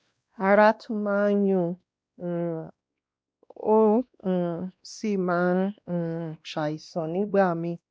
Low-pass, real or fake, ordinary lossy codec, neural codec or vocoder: none; fake; none; codec, 16 kHz, 1 kbps, X-Codec, WavLM features, trained on Multilingual LibriSpeech